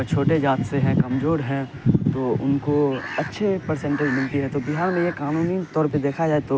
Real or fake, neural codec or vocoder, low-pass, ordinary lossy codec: real; none; none; none